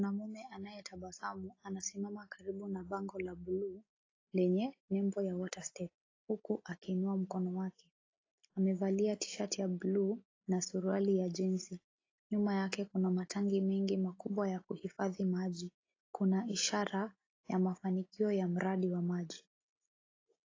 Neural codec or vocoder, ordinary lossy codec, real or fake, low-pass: none; AAC, 32 kbps; real; 7.2 kHz